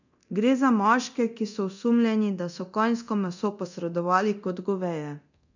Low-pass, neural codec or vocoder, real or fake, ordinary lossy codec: 7.2 kHz; codec, 24 kHz, 0.9 kbps, DualCodec; fake; none